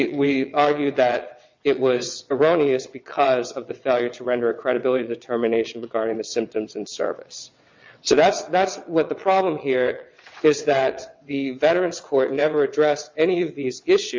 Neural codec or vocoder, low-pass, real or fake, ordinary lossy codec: vocoder, 22.05 kHz, 80 mel bands, WaveNeXt; 7.2 kHz; fake; MP3, 64 kbps